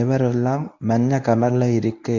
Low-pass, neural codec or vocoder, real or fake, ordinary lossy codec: 7.2 kHz; codec, 24 kHz, 0.9 kbps, WavTokenizer, medium speech release version 1; fake; none